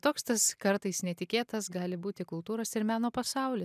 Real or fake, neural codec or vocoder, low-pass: real; none; 14.4 kHz